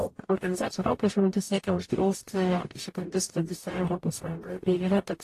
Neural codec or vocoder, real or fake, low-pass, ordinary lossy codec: codec, 44.1 kHz, 0.9 kbps, DAC; fake; 14.4 kHz; AAC, 48 kbps